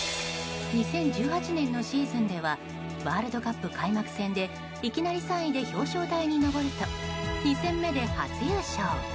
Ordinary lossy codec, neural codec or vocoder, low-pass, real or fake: none; none; none; real